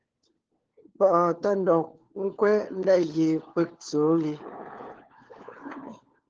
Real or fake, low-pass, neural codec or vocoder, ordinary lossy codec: fake; 7.2 kHz; codec, 16 kHz, 4 kbps, FunCodec, trained on LibriTTS, 50 frames a second; Opus, 16 kbps